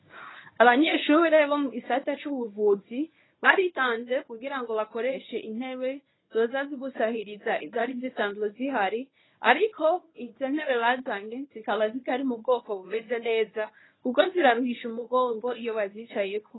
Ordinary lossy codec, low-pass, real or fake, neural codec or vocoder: AAC, 16 kbps; 7.2 kHz; fake; codec, 24 kHz, 0.9 kbps, WavTokenizer, medium speech release version 1